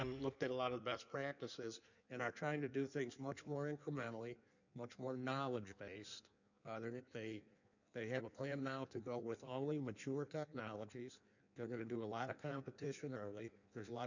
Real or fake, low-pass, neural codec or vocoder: fake; 7.2 kHz; codec, 16 kHz in and 24 kHz out, 1.1 kbps, FireRedTTS-2 codec